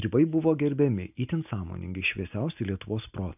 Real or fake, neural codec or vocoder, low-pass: real; none; 3.6 kHz